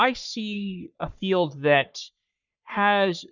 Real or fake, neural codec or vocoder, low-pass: fake; codec, 44.1 kHz, 3.4 kbps, Pupu-Codec; 7.2 kHz